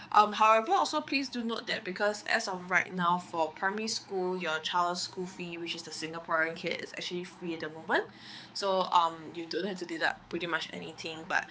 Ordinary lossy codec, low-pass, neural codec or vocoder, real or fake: none; none; codec, 16 kHz, 4 kbps, X-Codec, HuBERT features, trained on balanced general audio; fake